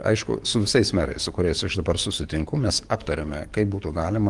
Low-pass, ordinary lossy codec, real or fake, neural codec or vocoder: 10.8 kHz; Opus, 24 kbps; fake; codec, 44.1 kHz, 7.8 kbps, DAC